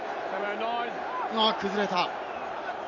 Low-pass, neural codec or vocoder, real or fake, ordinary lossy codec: 7.2 kHz; vocoder, 44.1 kHz, 128 mel bands every 256 samples, BigVGAN v2; fake; none